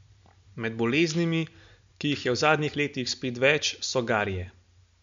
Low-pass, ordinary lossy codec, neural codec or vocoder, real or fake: 7.2 kHz; MP3, 64 kbps; none; real